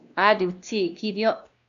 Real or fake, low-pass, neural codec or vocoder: fake; 7.2 kHz; codec, 16 kHz, 1 kbps, X-Codec, WavLM features, trained on Multilingual LibriSpeech